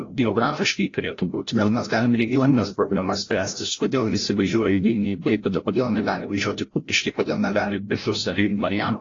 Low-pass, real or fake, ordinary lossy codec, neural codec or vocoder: 7.2 kHz; fake; AAC, 32 kbps; codec, 16 kHz, 0.5 kbps, FreqCodec, larger model